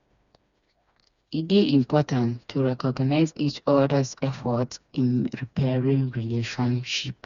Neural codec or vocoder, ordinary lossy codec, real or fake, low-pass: codec, 16 kHz, 2 kbps, FreqCodec, smaller model; Opus, 64 kbps; fake; 7.2 kHz